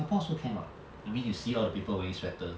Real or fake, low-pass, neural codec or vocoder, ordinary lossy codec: real; none; none; none